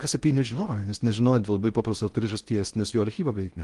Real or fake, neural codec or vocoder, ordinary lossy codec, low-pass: fake; codec, 16 kHz in and 24 kHz out, 0.6 kbps, FocalCodec, streaming, 4096 codes; Opus, 24 kbps; 10.8 kHz